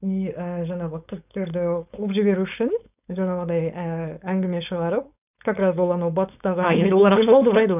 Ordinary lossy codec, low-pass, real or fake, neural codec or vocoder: none; 3.6 kHz; fake; codec, 16 kHz, 4.8 kbps, FACodec